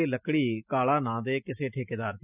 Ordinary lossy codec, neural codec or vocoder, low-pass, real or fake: none; none; 3.6 kHz; real